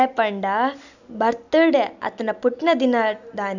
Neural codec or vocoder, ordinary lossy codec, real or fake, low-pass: none; none; real; 7.2 kHz